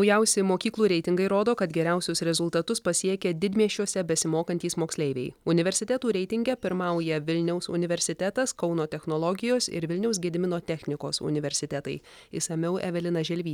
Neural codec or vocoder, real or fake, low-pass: none; real; 19.8 kHz